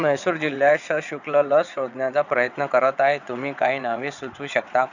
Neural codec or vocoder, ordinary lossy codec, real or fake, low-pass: vocoder, 22.05 kHz, 80 mel bands, WaveNeXt; none; fake; 7.2 kHz